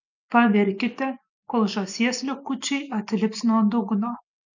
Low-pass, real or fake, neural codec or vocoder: 7.2 kHz; real; none